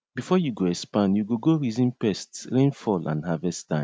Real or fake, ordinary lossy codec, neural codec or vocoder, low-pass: real; none; none; none